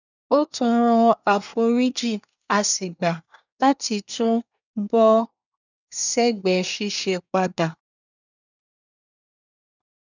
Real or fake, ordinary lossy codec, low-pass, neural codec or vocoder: fake; none; 7.2 kHz; codec, 24 kHz, 1 kbps, SNAC